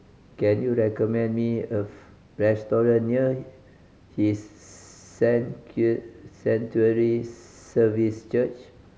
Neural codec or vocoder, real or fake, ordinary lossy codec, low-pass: none; real; none; none